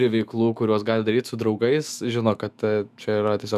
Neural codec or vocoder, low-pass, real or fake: autoencoder, 48 kHz, 128 numbers a frame, DAC-VAE, trained on Japanese speech; 14.4 kHz; fake